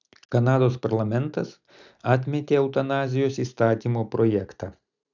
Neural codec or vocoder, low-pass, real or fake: none; 7.2 kHz; real